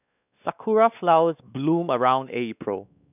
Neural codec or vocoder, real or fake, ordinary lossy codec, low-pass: codec, 16 kHz, 2 kbps, X-Codec, WavLM features, trained on Multilingual LibriSpeech; fake; none; 3.6 kHz